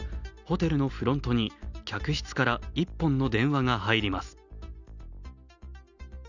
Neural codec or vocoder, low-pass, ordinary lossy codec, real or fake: none; 7.2 kHz; none; real